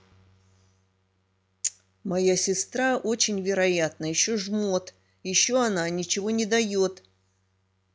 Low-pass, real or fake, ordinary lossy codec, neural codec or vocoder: none; real; none; none